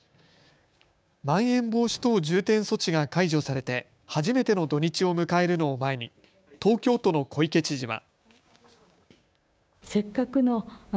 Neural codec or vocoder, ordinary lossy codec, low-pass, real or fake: codec, 16 kHz, 6 kbps, DAC; none; none; fake